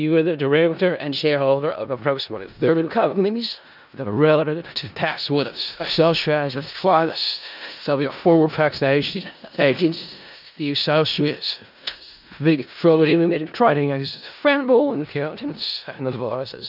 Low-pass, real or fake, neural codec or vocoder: 5.4 kHz; fake; codec, 16 kHz in and 24 kHz out, 0.4 kbps, LongCat-Audio-Codec, four codebook decoder